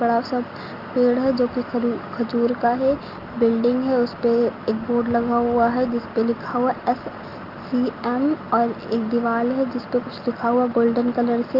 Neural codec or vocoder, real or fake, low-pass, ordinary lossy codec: none; real; 5.4 kHz; Opus, 32 kbps